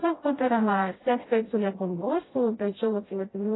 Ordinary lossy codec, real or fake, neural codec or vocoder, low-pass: AAC, 16 kbps; fake; codec, 16 kHz, 0.5 kbps, FreqCodec, smaller model; 7.2 kHz